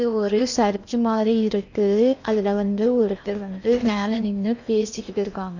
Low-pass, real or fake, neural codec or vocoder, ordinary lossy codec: 7.2 kHz; fake; codec, 16 kHz in and 24 kHz out, 0.8 kbps, FocalCodec, streaming, 65536 codes; none